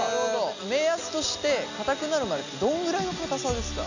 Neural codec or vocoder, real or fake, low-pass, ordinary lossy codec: none; real; 7.2 kHz; none